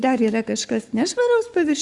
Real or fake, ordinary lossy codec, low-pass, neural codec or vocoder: fake; Opus, 64 kbps; 10.8 kHz; codec, 44.1 kHz, 7.8 kbps, Pupu-Codec